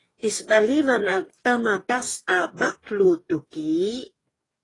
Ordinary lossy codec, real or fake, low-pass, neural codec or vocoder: AAC, 32 kbps; fake; 10.8 kHz; codec, 44.1 kHz, 2.6 kbps, DAC